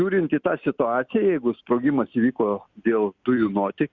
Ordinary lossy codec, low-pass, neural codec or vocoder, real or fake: Opus, 64 kbps; 7.2 kHz; none; real